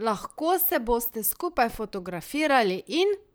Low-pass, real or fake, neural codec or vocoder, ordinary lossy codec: none; fake; vocoder, 44.1 kHz, 128 mel bands, Pupu-Vocoder; none